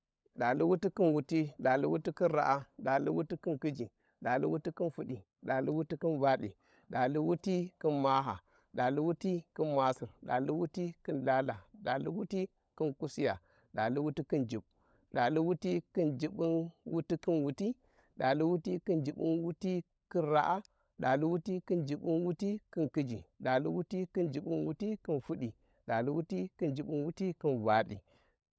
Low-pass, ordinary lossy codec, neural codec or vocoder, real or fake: none; none; codec, 16 kHz, 16 kbps, FunCodec, trained on LibriTTS, 50 frames a second; fake